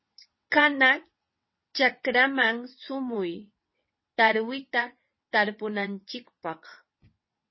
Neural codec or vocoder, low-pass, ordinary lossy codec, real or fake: vocoder, 22.05 kHz, 80 mel bands, WaveNeXt; 7.2 kHz; MP3, 24 kbps; fake